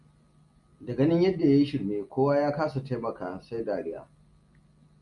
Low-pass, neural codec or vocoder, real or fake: 10.8 kHz; none; real